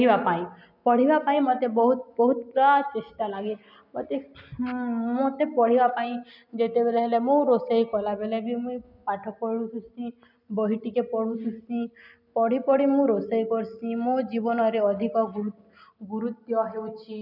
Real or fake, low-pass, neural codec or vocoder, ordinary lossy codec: real; 5.4 kHz; none; none